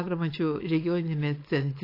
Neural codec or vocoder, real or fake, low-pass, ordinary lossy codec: codec, 16 kHz, 4.8 kbps, FACodec; fake; 5.4 kHz; AAC, 32 kbps